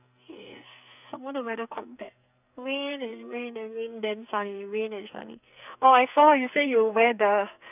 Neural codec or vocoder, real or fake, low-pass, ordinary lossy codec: codec, 44.1 kHz, 2.6 kbps, SNAC; fake; 3.6 kHz; none